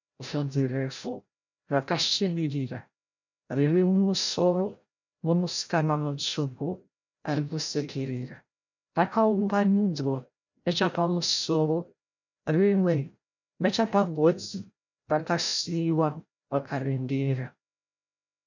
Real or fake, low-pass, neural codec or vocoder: fake; 7.2 kHz; codec, 16 kHz, 0.5 kbps, FreqCodec, larger model